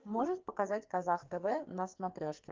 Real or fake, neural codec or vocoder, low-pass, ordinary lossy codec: fake; codec, 44.1 kHz, 2.6 kbps, SNAC; 7.2 kHz; Opus, 24 kbps